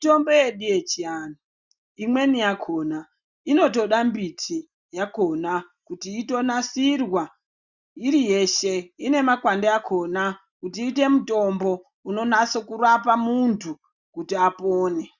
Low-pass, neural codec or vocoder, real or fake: 7.2 kHz; none; real